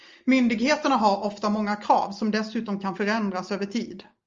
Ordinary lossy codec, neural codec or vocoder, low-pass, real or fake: Opus, 32 kbps; none; 7.2 kHz; real